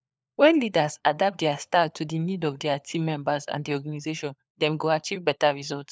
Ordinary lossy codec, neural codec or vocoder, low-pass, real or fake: none; codec, 16 kHz, 4 kbps, FunCodec, trained on LibriTTS, 50 frames a second; none; fake